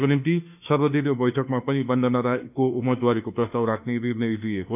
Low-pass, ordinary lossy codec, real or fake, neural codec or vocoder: 3.6 kHz; none; fake; autoencoder, 48 kHz, 32 numbers a frame, DAC-VAE, trained on Japanese speech